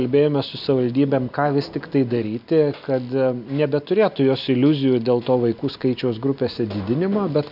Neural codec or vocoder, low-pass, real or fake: none; 5.4 kHz; real